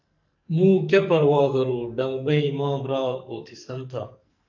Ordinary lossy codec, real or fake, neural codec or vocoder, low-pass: MP3, 64 kbps; fake; codec, 44.1 kHz, 2.6 kbps, SNAC; 7.2 kHz